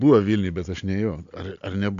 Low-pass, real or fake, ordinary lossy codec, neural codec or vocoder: 7.2 kHz; real; AAC, 64 kbps; none